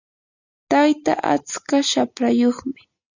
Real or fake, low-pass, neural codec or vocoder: real; 7.2 kHz; none